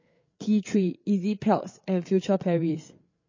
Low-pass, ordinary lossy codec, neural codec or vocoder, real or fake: 7.2 kHz; MP3, 32 kbps; codec, 16 kHz, 8 kbps, FreqCodec, larger model; fake